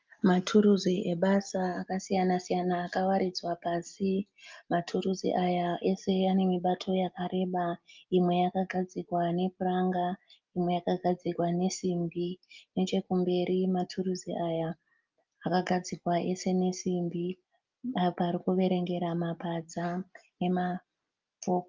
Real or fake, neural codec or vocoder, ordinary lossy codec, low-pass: real; none; Opus, 24 kbps; 7.2 kHz